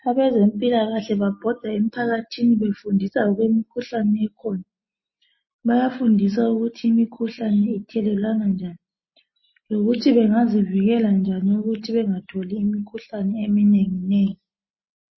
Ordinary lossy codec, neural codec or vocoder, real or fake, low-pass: MP3, 24 kbps; none; real; 7.2 kHz